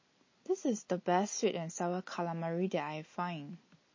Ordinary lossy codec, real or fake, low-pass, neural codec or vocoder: MP3, 32 kbps; real; 7.2 kHz; none